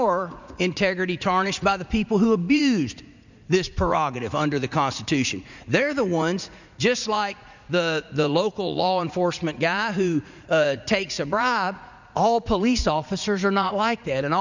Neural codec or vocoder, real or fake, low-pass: vocoder, 44.1 kHz, 80 mel bands, Vocos; fake; 7.2 kHz